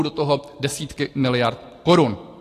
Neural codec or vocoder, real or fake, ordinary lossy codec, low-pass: none; real; AAC, 48 kbps; 14.4 kHz